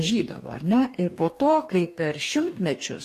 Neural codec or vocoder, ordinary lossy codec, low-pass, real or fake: codec, 44.1 kHz, 2.6 kbps, DAC; AAC, 64 kbps; 14.4 kHz; fake